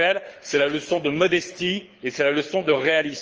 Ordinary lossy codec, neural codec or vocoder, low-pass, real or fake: Opus, 24 kbps; codec, 24 kHz, 6 kbps, HILCodec; 7.2 kHz; fake